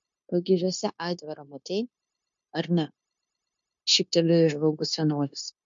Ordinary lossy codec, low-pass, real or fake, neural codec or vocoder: MP3, 48 kbps; 7.2 kHz; fake; codec, 16 kHz, 0.9 kbps, LongCat-Audio-Codec